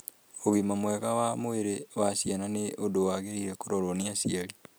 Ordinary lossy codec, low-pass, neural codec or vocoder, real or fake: none; none; none; real